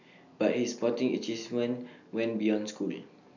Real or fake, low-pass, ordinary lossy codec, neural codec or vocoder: real; 7.2 kHz; none; none